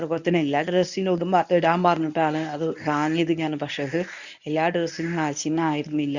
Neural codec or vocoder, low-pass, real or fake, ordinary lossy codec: codec, 24 kHz, 0.9 kbps, WavTokenizer, medium speech release version 1; 7.2 kHz; fake; none